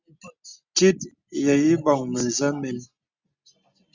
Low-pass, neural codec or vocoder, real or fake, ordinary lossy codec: 7.2 kHz; none; real; Opus, 64 kbps